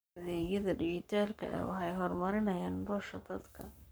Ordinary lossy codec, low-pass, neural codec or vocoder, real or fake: none; none; codec, 44.1 kHz, 7.8 kbps, Pupu-Codec; fake